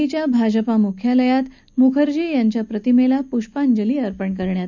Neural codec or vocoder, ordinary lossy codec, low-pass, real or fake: none; none; 7.2 kHz; real